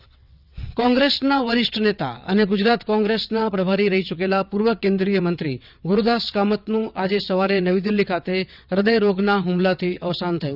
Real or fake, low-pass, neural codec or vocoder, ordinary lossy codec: fake; 5.4 kHz; vocoder, 22.05 kHz, 80 mel bands, WaveNeXt; none